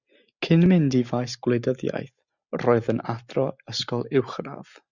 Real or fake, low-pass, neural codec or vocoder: real; 7.2 kHz; none